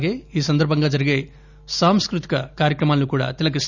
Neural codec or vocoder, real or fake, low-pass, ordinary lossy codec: none; real; 7.2 kHz; none